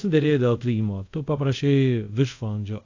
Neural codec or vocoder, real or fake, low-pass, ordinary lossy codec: codec, 24 kHz, 0.5 kbps, DualCodec; fake; 7.2 kHz; AAC, 48 kbps